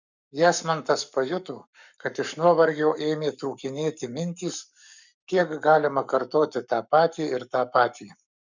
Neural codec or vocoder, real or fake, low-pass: codec, 44.1 kHz, 7.8 kbps, Pupu-Codec; fake; 7.2 kHz